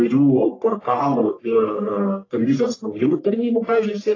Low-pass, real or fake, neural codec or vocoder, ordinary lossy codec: 7.2 kHz; fake; codec, 44.1 kHz, 1.7 kbps, Pupu-Codec; AAC, 32 kbps